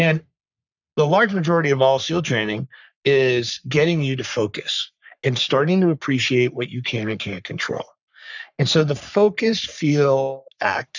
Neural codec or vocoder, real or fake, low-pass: codec, 44.1 kHz, 3.4 kbps, Pupu-Codec; fake; 7.2 kHz